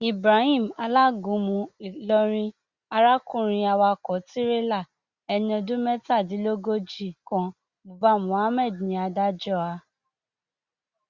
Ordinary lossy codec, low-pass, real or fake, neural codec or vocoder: Opus, 64 kbps; 7.2 kHz; real; none